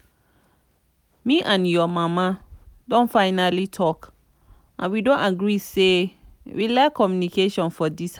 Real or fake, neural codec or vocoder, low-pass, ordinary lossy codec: real; none; 19.8 kHz; none